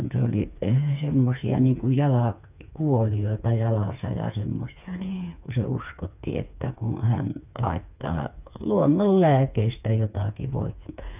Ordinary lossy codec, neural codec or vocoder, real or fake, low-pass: none; codec, 16 kHz, 4 kbps, FreqCodec, smaller model; fake; 3.6 kHz